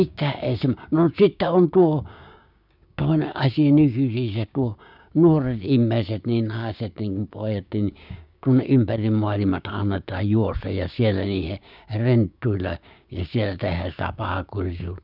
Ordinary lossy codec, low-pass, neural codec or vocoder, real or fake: none; 5.4 kHz; codec, 16 kHz, 6 kbps, DAC; fake